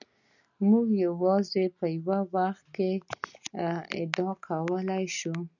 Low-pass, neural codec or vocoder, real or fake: 7.2 kHz; none; real